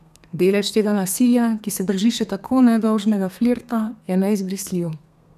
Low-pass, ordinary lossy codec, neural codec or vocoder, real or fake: 14.4 kHz; none; codec, 32 kHz, 1.9 kbps, SNAC; fake